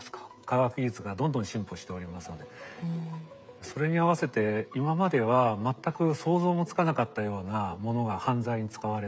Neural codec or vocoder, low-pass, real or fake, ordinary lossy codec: codec, 16 kHz, 16 kbps, FreqCodec, smaller model; none; fake; none